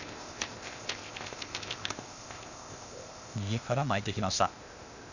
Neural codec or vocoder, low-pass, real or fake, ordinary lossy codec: codec, 16 kHz, 0.8 kbps, ZipCodec; 7.2 kHz; fake; none